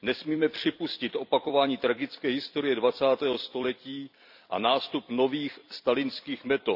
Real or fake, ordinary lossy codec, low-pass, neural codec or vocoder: fake; none; 5.4 kHz; vocoder, 44.1 kHz, 128 mel bands every 256 samples, BigVGAN v2